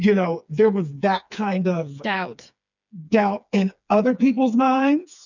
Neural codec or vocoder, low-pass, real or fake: codec, 16 kHz, 4 kbps, FreqCodec, smaller model; 7.2 kHz; fake